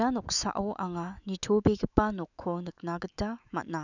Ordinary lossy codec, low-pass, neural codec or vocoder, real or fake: none; 7.2 kHz; autoencoder, 48 kHz, 128 numbers a frame, DAC-VAE, trained on Japanese speech; fake